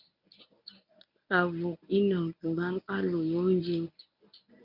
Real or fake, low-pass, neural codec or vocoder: fake; 5.4 kHz; codec, 24 kHz, 0.9 kbps, WavTokenizer, medium speech release version 1